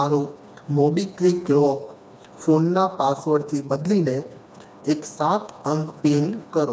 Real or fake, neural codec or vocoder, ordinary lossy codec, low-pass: fake; codec, 16 kHz, 2 kbps, FreqCodec, smaller model; none; none